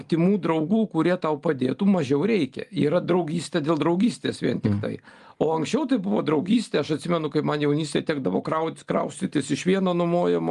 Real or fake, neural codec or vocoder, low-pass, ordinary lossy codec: real; none; 10.8 kHz; Opus, 32 kbps